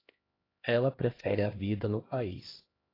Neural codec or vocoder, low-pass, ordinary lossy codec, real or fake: codec, 16 kHz, 1 kbps, X-Codec, HuBERT features, trained on LibriSpeech; 5.4 kHz; AAC, 24 kbps; fake